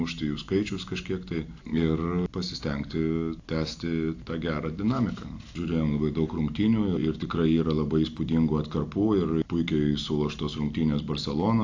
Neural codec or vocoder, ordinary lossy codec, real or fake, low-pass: none; AAC, 48 kbps; real; 7.2 kHz